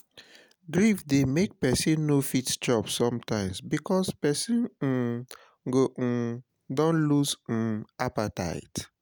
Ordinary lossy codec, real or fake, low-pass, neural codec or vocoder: none; real; none; none